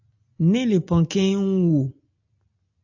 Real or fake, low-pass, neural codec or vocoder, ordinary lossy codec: real; 7.2 kHz; none; AAC, 48 kbps